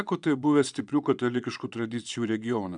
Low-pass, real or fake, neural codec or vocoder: 9.9 kHz; fake; vocoder, 22.05 kHz, 80 mel bands, Vocos